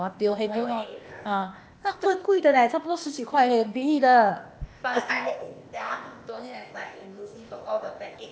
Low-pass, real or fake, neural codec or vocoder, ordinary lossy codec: none; fake; codec, 16 kHz, 0.8 kbps, ZipCodec; none